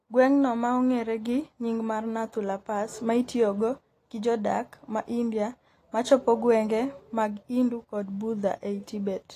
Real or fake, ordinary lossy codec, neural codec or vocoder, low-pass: real; AAC, 48 kbps; none; 14.4 kHz